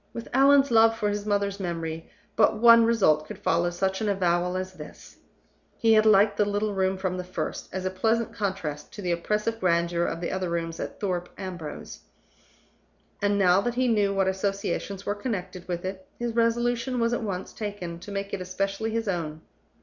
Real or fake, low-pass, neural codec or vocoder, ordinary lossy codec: real; 7.2 kHz; none; Opus, 64 kbps